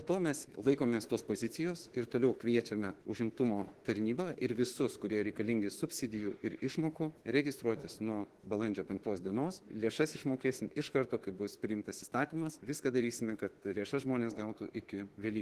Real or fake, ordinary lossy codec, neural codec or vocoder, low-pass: fake; Opus, 16 kbps; autoencoder, 48 kHz, 32 numbers a frame, DAC-VAE, trained on Japanese speech; 14.4 kHz